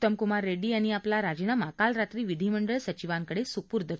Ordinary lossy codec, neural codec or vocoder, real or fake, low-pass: none; none; real; none